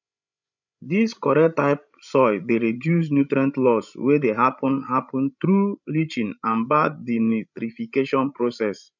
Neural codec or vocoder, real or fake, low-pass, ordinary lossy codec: codec, 16 kHz, 16 kbps, FreqCodec, larger model; fake; 7.2 kHz; none